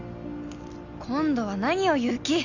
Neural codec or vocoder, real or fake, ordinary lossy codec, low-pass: none; real; none; 7.2 kHz